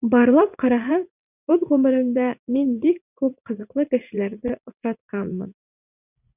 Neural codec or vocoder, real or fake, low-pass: vocoder, 44.1 kHz, 80 mel bands, Vocos; fake; 3.6 kHz